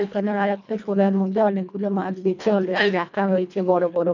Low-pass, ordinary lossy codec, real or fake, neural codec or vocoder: 7.2 kHz; none; fake; codec, 24 kHz, 1.5 kbps, HILCodec